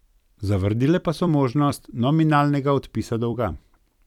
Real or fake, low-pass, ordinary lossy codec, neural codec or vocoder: fake; 19.8 kHz; none; vocoder, 44.1 kHz, 128 mel bands every 256 samples, BigVGAN v2